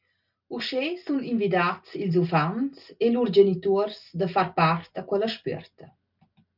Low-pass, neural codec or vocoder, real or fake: 5.4 kHz; none; real